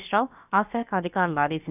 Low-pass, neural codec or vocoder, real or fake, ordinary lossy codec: 3.6 kHz; codec, 16 kHz, about 1 kbps, DyCAST, with the encoder's durations; fake; none